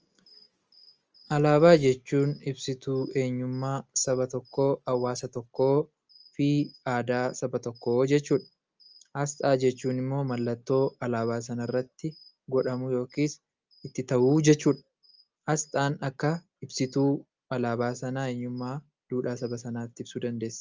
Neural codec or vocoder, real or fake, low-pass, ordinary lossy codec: none; real; 7.2 kHz; Opus, 24 kbps